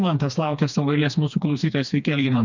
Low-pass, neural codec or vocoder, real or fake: 7.2 kHz; codec, 16 kHz, 2 kbps, FreqCodec, smaller model; fake